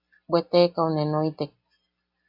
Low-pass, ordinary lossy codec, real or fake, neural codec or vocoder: 5.4 kHz; AAC, 32 kbps; real; none